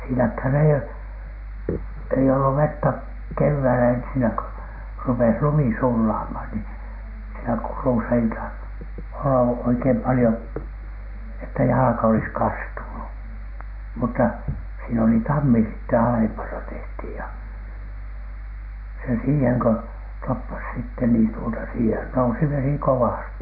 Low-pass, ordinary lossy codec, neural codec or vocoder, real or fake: 5.4 kHz; none; none; real